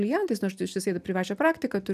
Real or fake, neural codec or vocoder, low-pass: real; none; 14.4 kHz